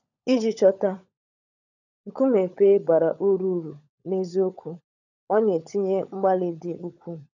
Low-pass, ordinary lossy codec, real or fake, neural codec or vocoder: 7.2 kHz; none; fake; codec, 16 kHz, 16 kbps, FunCodec, trained on LibriTTS, 50 frames a second